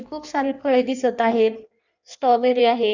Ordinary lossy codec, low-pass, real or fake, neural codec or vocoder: none; 7.2 kHz; fake; codec, 16 kHz in and 24 kHz out, 1.1 kbps, FireRedTTS-2 codec